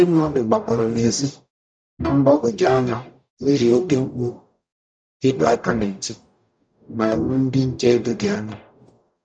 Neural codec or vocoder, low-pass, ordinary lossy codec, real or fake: codec, 44.1 kHz, 0.9 kbps, DAC; 9.9 kHz; none; fake